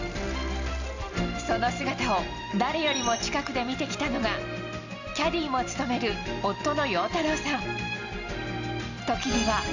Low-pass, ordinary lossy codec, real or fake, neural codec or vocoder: 7.2 kHz; Opus, 64 kbps; real; none